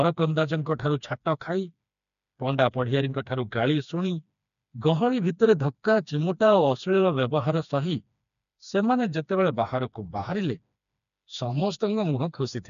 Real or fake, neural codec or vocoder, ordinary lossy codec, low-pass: fake; codec, 16 kHz, 2 kbps, FreqCodec, smaller model; none; 7.2 kHz